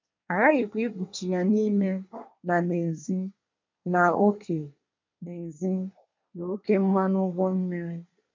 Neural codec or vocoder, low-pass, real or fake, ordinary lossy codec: codec, 24 kHz, 1 kbps, SNAC; 7.2 kHz; fake; MP3, 64 kbps